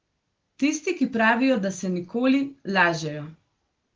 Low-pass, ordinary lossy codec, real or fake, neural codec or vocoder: 7.2 kHz; Opus, 16 kbps; real; none